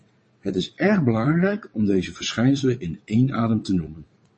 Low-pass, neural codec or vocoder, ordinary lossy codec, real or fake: 9.9 kHz; vocoder, 22.05 kHz, 80 mel bands, WaveNeXt; MP3, 32 kbps; fake